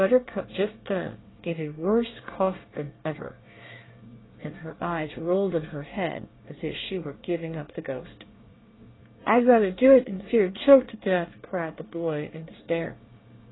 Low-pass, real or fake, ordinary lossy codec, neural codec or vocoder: 7.2 kHz; fake; AAC, 16 kbps; codec, 24 kHz, 1 kbps, SNAC